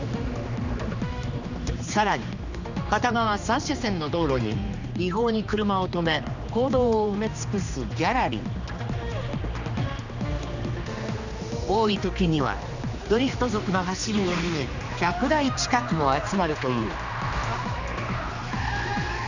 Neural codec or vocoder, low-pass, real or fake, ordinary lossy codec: codec, 16 kHz, 2 kbps, X-Codec, HuBERT features, trained on general audio; 7.2 kHz; fake; none